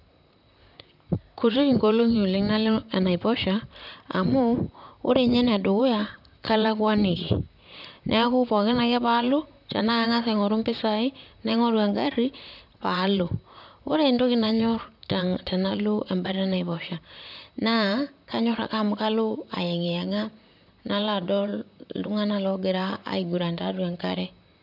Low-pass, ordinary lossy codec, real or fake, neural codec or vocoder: 5.4 kHz; none; fake; vocoder, 44.1 kHz, 128 mel bands, Pupu-Vocoder